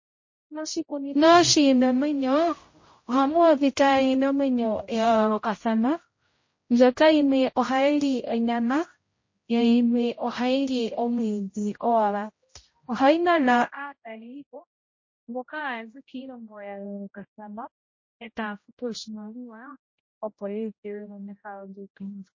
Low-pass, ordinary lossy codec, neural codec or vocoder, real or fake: 7.2 kHz; MP3, 32 kbps; codec, 16 kHz, 0.5 kbps, X-Codec, HuBERT features, trained on general audio; fake